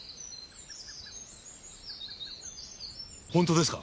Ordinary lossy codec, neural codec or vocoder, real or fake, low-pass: none; none; real; none